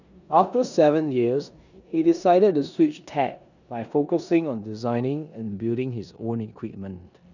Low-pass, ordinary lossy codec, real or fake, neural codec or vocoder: 7.2 kHz; none; fake; codec, 16 kHz in and 24 kHz out, 0.9 kbps, LongCat-Audio-Codec, four codebook decoder